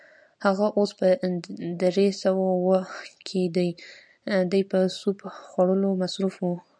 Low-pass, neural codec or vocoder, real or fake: 9.9 kHz; none; real